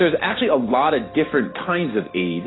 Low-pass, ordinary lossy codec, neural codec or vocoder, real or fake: 7.2 kHz; AAC, 16 kbps; none; real